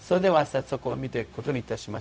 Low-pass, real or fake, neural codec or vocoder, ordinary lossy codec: none; fake; codec, 16 kHz, 0.4 kbps, LongCat-Audio-Codec; none